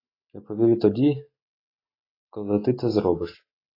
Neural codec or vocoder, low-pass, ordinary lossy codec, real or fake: none; 5.4 kHz; AAC, 24 kbps; real